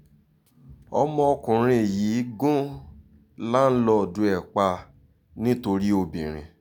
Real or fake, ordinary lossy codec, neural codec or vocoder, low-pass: real; none; none; 19.8 kHz